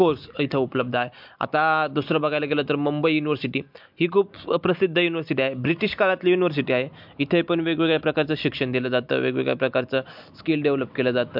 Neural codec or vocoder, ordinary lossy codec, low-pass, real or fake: none; MP3, 48 kbps; 5.4 kHz; real